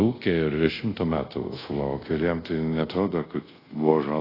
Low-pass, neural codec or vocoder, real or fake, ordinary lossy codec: 5.4 kHz; codec, 24 kHz, 0.5 kbps, DualCodec; fake; AAC, 24 kbps